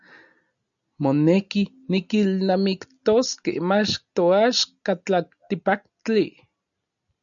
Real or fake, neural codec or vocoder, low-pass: real; none; 7.2 kHz